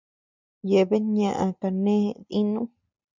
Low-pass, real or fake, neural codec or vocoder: 7.2 kHz; real; none